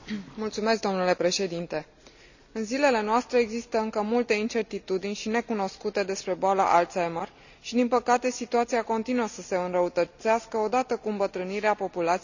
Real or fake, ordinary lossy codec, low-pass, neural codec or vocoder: real; none; 7.2 kHz; none